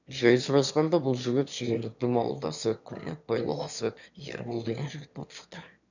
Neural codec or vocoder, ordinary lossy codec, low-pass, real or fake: autoencoder, 22.05 kHz, a latent of 192 numbers a frame, VITS, trained on one speaker; none; 7.2 kHz; fake